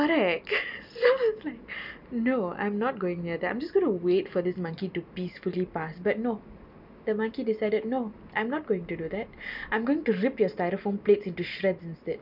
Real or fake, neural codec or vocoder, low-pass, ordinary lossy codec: real; none; 5.4 kHz; none